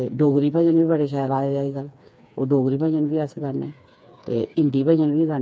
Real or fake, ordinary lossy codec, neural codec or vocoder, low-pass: fake; none; codec, 16 kHz, 4 kbps, FreqCodec, smaller model; none